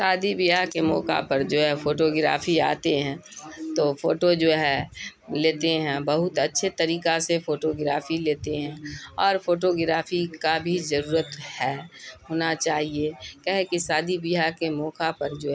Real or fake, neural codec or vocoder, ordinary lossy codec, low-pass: real; none; none; none